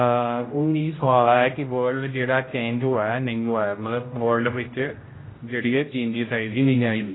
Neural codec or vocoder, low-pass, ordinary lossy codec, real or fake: codec, 16 kHz, 0.5 kbps, X-Codec, HuBERT features, trained on general audio; 7.2 kHz; AAC, 16 kbps; fake